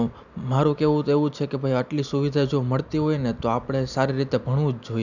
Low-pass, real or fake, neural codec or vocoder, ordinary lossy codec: 7.2 kHz; real; none; none